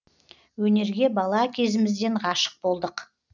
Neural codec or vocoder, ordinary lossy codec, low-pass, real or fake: none; none; 7.2 kHz; real